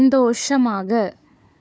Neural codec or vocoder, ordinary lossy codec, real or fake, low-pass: codec, 16 kHz, 4 kbps, FunCodec, trained on Chinese and English, 50 frames a second; none; fake; none